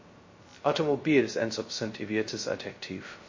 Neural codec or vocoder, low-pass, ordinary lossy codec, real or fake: codec, 16 kHz, 0.2 kbps, FocalCodec; 7.2 kHz; MP3, 32 kbps; fake